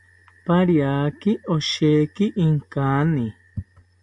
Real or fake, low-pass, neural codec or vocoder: real; 10.8 kHz; none